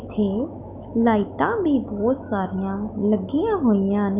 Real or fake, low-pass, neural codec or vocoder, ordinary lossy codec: fake; 3.6 kHz; autoencoder, 48 kHz, 128 numbers a frame, DAC-VAE, trained on Japanese speech; none